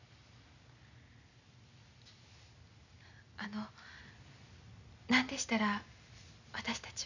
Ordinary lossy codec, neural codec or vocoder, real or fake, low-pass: none; none; real; 7.2 kHz